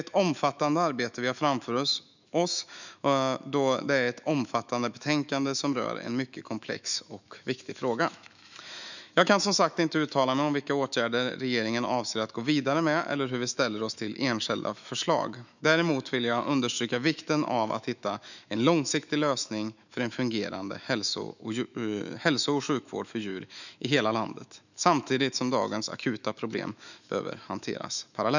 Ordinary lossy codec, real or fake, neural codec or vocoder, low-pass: none; fake; autoencoder, 48 kHz, 128 numbers a frame, DAC-VAE, trained on Japanese speech; 7.2 kHz